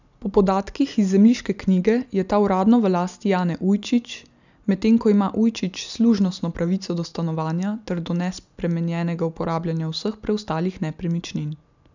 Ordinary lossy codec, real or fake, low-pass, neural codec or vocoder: none; real; 7.2 kHz; none